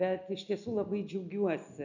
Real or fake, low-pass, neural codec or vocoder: real; 7.2 kHz; none